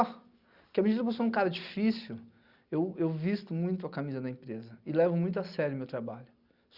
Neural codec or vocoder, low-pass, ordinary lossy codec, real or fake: none; 5.4 kHz; Opus, 64 kbps; real